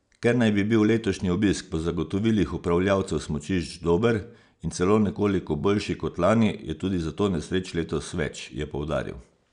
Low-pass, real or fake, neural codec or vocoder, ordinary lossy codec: 9.9 kHz; real; none; none